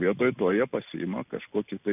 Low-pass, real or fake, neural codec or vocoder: 3.6 kHz; real; none